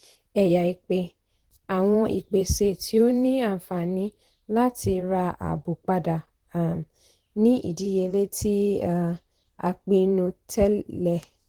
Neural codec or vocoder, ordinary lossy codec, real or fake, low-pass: vocoder, 44.1 kHz, 128 mel bands, Pupu-Vocoder; Opus, 16 kbps; fake; 19.8 kHz